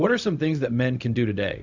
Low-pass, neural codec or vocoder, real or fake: 7.2 kHz; codec, 16 kHz, 0.4 kbps, LongCat-Audio-Codec; fake